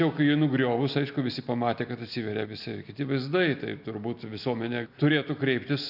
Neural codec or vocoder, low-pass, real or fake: none; 5.4 kHz; real